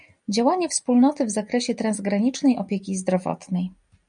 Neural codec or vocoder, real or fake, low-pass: none; real; 9.9 kHz